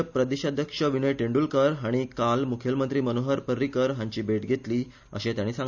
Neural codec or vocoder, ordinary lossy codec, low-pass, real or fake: none; none; 7.2 kHz; real